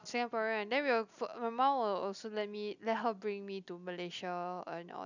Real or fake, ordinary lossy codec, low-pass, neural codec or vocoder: real; none; 7.2 kHz; none